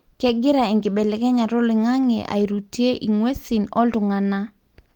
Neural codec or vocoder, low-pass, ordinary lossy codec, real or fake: none; 19.8 kHz; Opus, 24 kbps; real